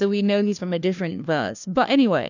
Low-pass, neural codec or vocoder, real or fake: 7.2 kHz; codec, 16 kHz, 1 kbps, FunCodec, trained on LibriTTS, 50 frames a second; fake